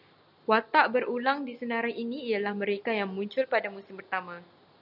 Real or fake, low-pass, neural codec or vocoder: real; 5.4 kHz; none